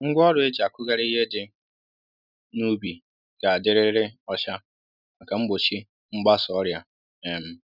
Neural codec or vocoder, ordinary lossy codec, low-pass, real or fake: none; none; 5.4 kHz; real